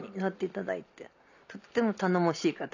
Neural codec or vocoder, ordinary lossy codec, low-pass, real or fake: none; none; 7.2 kHz; real